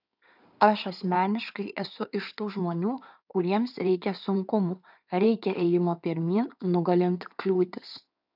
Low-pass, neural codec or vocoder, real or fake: 5.4 kHz; codec, 16 kHz in and 24 kHz out, 2.2 kbps, FireRedTTS-2 codec; fake